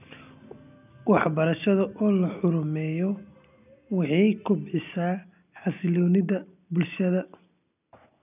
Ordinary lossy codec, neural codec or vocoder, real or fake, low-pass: none; none; real; 3.6 kHz